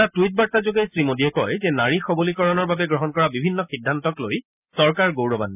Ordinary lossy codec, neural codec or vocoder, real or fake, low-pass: none; none; real; 3.6 kHz